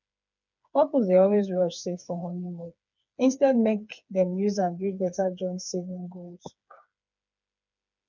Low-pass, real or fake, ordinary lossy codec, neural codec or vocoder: 7.2 kHz; fake; none; codec, 16 kHz, 4 kbps, FreqCodec, smaller model